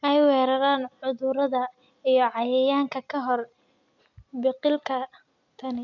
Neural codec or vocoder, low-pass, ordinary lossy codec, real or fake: none; 7.2 kHz; none; real